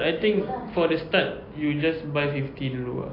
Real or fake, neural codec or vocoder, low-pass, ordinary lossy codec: real; none; 5.4 kHz; AAC, 24 kbps